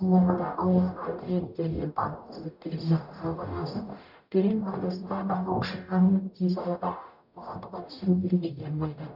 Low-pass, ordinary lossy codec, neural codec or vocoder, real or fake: 5.4 kHz; none; codec, 44.1 kHz, 0.9 kbps, DAC; fake